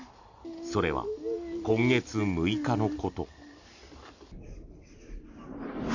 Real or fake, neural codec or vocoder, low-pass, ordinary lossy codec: real; none; 7.2 kHz; none